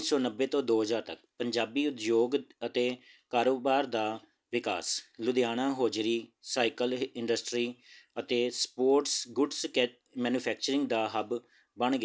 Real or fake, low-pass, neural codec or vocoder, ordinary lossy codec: real; none; none; none